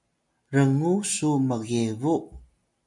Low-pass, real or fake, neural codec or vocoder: 10.8 kHz; real; none